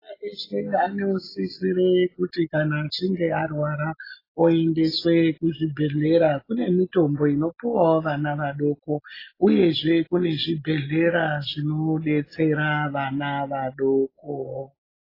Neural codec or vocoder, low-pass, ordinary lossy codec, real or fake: none; 5.4 kHz; AAC, 24 kbps; real